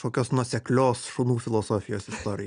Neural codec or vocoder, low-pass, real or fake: none; 9.9 kHz; real